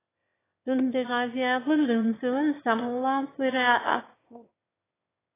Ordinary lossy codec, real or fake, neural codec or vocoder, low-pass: AAC, 16 kbps; fake; autoencoder, 22.05 kHz, a latent of 192 numbers a frame, VITS, trained on one speaker; 3.6 kHz